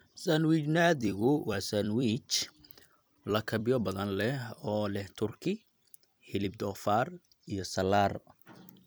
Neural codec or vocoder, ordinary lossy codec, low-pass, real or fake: vocoder, 44.1 kHz, 128 mel bands every 256 samples, BigVGAN v2; none; none; fake